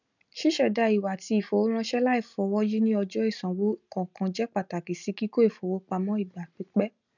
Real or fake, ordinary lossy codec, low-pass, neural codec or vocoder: real; none; 7.2 kHz; none